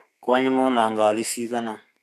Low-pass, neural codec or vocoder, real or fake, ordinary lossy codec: 14.4 kHz; codec, 32 kHz, 1.9 kbps, SNAC; fake; none